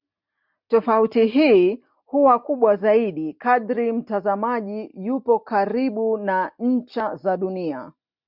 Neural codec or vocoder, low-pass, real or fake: none; 5.4 kHz; real